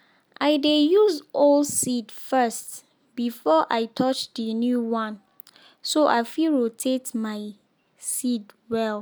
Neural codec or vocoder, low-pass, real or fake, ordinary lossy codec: none; none; real; none